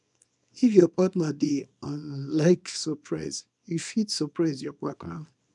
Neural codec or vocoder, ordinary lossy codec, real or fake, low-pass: codec, 24 kHz, 0.9 kbps, WavTokenizer, small release; none; fake; 10.8 kHz